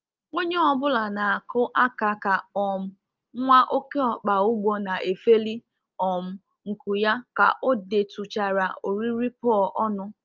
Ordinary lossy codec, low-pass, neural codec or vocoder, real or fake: Opus, 24 kbps; 7.2 kHz; none; real